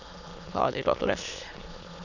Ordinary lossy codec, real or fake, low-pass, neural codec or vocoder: none; fake; 7.2 kHz; autoencoder, 22.05 kHz, a latent of 192 numbers a frame, VITS, trained on many speakers